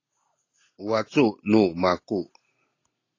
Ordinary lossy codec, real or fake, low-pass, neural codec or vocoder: AAC, 32 kbps; real; 7.2 kHz; none